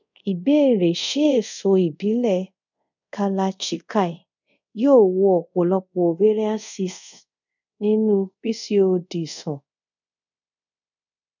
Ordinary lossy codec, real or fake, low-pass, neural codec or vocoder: none; fake; 7.2 kHz; codec, 24 kHz, 0.5 kbps, DualCodec